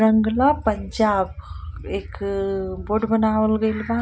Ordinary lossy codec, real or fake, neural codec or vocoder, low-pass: none; real; none; none